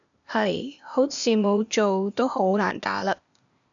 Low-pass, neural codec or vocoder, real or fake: 7.2 kHz; codec, 16 kHz, 0.8 kbps, ZipCodec; fake